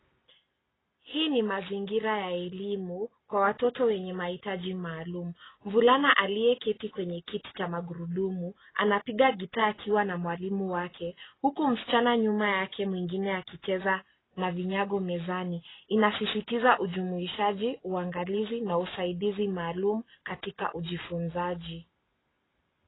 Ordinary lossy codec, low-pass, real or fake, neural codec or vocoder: AAC, 16 kbps; 7.2 kHz; real; none